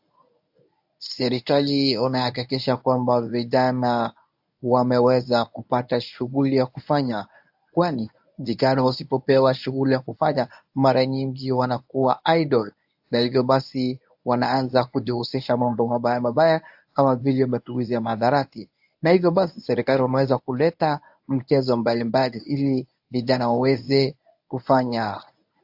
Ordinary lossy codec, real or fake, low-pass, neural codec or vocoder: AAC, 48 kbps; fake; 5.4 kHz; codec, 24 kHz, 0.9 kbps, WavTokenizer, medium speech release version 1